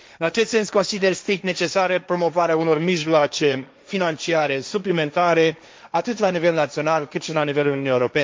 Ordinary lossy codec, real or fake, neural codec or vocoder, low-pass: none; fake; codec, 16 kHz, 1.1 kbps, Voila-Tokenizer; none